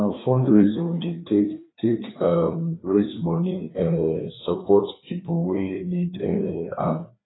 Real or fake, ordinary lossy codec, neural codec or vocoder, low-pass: fake; AAC, 16 kbps; codec, 16 kHz, 2 kbps, FreqCodec, larger model; 7.2 kHz